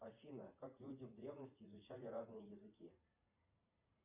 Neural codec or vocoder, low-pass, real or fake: vocoder, 44.1 kHz, 80 mel bands, Vocos; 3.6 kHz; fake